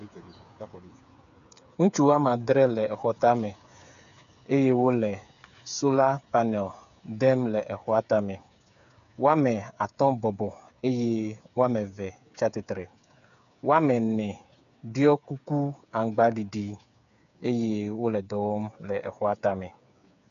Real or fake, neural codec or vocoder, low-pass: fake; codec, 16 kHz, 8 kbps, FreqCodec, smaller model; 7.2 kHz